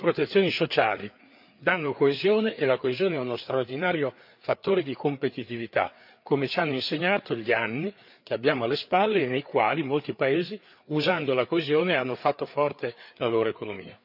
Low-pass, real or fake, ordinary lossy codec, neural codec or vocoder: 5.4 kHz; fake; none; codec, 16 kHz, 4 kbps, FreqCodec, larger model